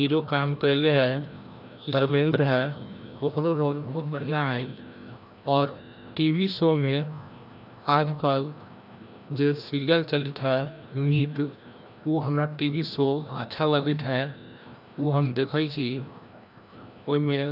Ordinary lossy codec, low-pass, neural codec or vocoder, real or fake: none; 5.4 kHz; codec, 16 kHz, 1 kbps, FreqCodec, larger model; fake